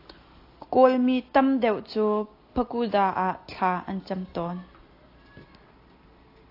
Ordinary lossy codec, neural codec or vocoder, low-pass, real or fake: AAC, 32 kbps; none; 5.4 kHz; real